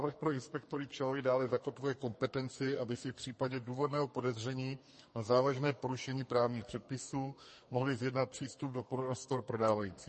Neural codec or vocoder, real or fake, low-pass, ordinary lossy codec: codec, 32 kHz, 1.9 kbps, SNAC; fake; 10.8 kHz; MP3, 32 kbps